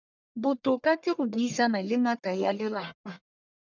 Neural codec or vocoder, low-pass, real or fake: codec, 44.1 kHz, 1.7 kbps, Pupu-Codec; 7.2 kHz; fake